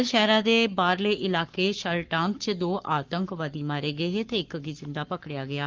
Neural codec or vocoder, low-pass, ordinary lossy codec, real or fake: codec, 44.1 kHz, 7.8 kbps, Pupu-Codec; 7.2 kHz; Opus, 32 kbps; fake